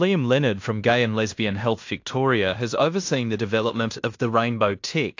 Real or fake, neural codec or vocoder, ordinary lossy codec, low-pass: fake; codec, 16 kHz in and 24 kHz out, 0.9 kbps, LongCat-Audio-Codec, fine tuned four codebook decoder; AAC, 48 kbps; 7.2 kHz